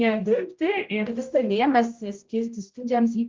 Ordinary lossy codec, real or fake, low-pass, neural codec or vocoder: Opus, 32 kbps; fake; 7.2 kHz; codec, 16 kHz, 0.5 kbps, X-Codec, HuBERT features, trained on general audio